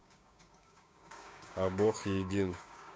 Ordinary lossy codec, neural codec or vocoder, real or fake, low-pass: none; codec, 16 kHz, 6 kbps, DAC; fake; none